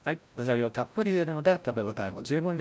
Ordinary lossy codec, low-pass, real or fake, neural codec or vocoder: none; none; fake; codec, 16 kHz, 0.5 kbps, FreqCodec, larger model